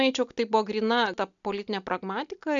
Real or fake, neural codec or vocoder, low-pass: real; none; 7.2 kHz